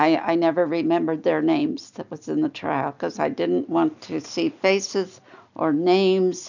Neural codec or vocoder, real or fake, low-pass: none; real; 7.2 kHz